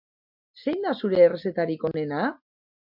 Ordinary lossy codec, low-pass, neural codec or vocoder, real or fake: MP3, 48 kbps; 5.4 kHz; none; real